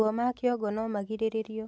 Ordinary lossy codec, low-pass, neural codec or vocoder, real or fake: none; none; none; real